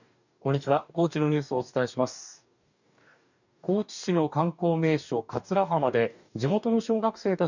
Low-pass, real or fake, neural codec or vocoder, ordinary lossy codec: 7.2 kHz; fake; codec, 44.1 kHz, 2.6 kbps, DAC; none